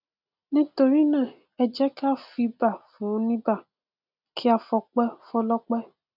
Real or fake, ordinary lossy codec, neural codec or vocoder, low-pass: real; none; none; 5.4 kHz